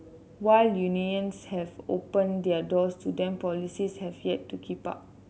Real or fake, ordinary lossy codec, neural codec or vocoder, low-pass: real; none; none; none